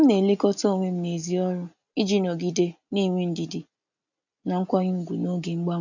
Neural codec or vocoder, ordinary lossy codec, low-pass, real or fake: none; none; 7.2 kHz; real